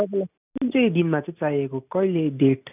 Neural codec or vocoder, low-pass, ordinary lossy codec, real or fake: none; 3.6 kHz; none; real